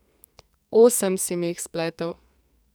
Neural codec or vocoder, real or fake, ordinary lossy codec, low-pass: codec, 44.1 kHz, 2.6 kbps, SNAC; fake; none; none